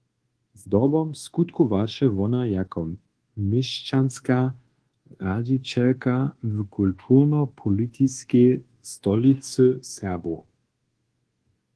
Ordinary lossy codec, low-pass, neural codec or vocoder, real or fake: Opus, 16 kbps; 10.8 kHz; codec, 24 kHz, 1.2 kbps, DualCodec; fake